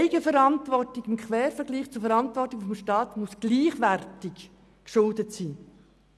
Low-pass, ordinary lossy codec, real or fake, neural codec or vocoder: none; none; real; none